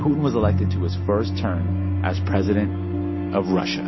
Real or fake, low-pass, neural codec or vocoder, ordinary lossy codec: real; 7.2 kHz; none; MP3, 24 kbps